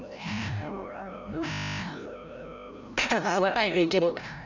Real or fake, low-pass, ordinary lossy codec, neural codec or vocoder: fake; 7.2 kHz; none; codec, 16 kHz, 0.5 kbps, FreqCodec, larger model